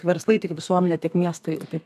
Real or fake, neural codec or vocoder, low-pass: fake; codec, 32 kHz, 1.9 kbps, SNAC; 14.4 kHz